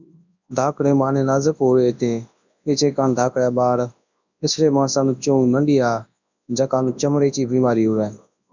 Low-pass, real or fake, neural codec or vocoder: 7.2 kHz; fake; codec, 24 kHz, 0.9 kbps, WavTokenizer, large speech release